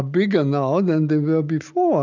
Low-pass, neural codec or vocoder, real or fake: 7.2 kHz; none; real